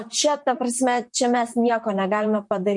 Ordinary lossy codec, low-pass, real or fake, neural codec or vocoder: MP3, 48 kbps; 10.8 kHz; fake; vocoder, 44.1 kHz, 128 mel bands, Pupu-Vocoder